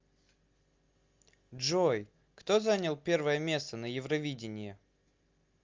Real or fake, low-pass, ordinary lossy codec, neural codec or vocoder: real; 7.2 kHz; Opus, 32 kbps; none